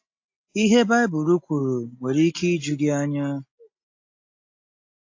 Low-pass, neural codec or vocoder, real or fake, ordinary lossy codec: 7.2 kHz; none; real; AAC, 48 kbps